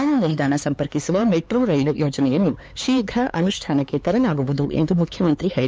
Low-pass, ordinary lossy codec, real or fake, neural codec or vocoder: none; none; fake; codec, 16 kHz, 4 kbps, X-Codec, HuBERT features, trained on LibriSpeech